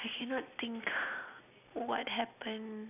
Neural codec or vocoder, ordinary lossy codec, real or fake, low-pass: none; none; real; 3.6 kHz